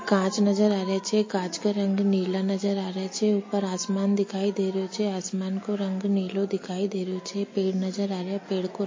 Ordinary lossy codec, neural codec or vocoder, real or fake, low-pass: MP3, 32 kbps; none; real; 7.2 kHz